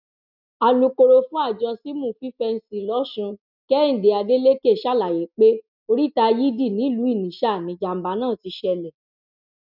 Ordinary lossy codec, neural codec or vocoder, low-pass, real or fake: none; none; 5.4 kHz; real